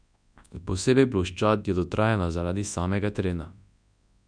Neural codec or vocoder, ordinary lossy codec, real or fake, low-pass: codec, 24 kHz, 0.9 kbps, WavTokenizer, large speech release; none; fake; 9.9 kHz